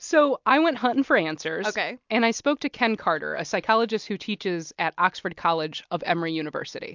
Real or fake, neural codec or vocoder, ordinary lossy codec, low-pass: real; none; MP3, 64 kbps; 7.2 kHz